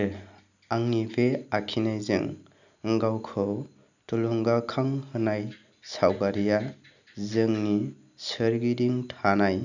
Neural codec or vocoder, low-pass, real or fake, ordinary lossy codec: none; 7.2 kHz; real; none